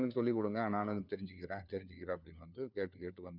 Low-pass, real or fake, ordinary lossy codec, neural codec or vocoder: 5.4 kHz; fake; none; codec, 16 kHz, 4 kbps, FunCodec, trained on LibriTTS, 50 frames a second